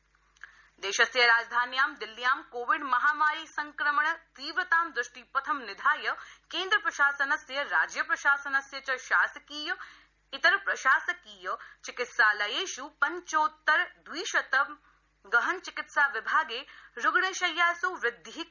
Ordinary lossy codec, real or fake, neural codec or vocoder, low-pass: none; real; none; 7.2 kHz